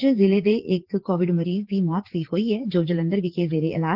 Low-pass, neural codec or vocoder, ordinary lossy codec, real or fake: 5.4 kHz; vocoder, 44.1 kHz, 80 mel bands, Vocos; Opus, 16 kbps; fake